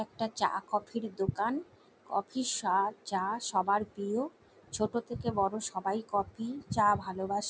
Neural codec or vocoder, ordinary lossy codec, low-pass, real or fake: none; none; none; real